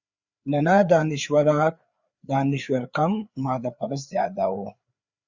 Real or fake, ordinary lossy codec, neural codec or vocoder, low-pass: fake; Opus, 64 kbps; codec, 16 kHz, 4 kbps, FreqCodec, larger model; 7.2 kHz